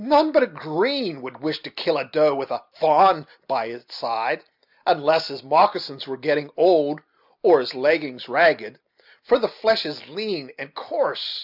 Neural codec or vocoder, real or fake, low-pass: none; real; 5.4 kHz